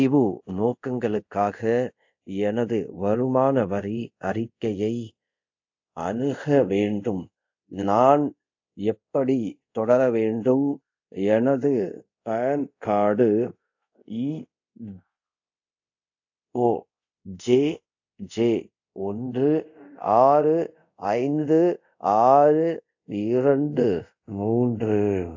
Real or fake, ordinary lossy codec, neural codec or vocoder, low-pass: fake; AAC, 48 kbps; codec, 24 kHz, 0.5 kbps, DualCodec; 7.2 kHz